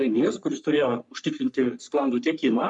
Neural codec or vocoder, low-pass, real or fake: codec, 44.1 kHz, 3.4 kbps, Pupu-Codec; 10.8 kHz; fake